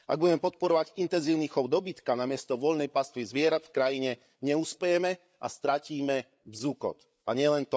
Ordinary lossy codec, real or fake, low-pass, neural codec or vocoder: none; fake; none; codec, 16 kHz, 8 kbps, FreqCodec, larger model